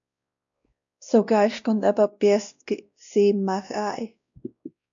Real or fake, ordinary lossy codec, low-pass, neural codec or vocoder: fake; MP3, 64 kbps; 7.2 kHz; codec, 16 kHz, 1 kbps, X-Codec, WavLM features, trained on Multilingual LibriSpeech